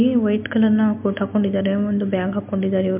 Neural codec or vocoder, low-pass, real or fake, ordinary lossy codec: none; 3.6 kHz; real; MP3, 32 kbps